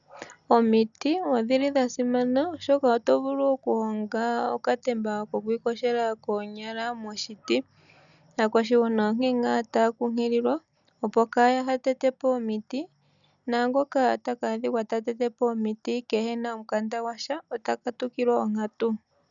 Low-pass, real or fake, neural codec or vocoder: 7.2 kHz; real; none